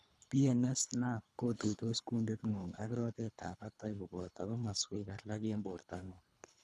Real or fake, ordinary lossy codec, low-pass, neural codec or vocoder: fake; none; none; codec, 24 kHz, 3 kbps, HILCodec